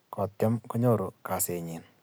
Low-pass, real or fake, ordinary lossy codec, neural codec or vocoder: none; real; none; none